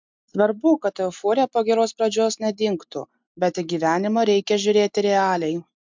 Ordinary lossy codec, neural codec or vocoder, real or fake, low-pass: MP3, 64 kbps; none; real; 7.2 kHz